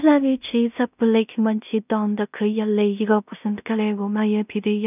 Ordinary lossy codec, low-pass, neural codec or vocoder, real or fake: none; 3.6 kHz; codec, 16 kHz in and 24 kHz out, 0.4 kbps, LongCat-Audio-Codec, two codebook decoder; fake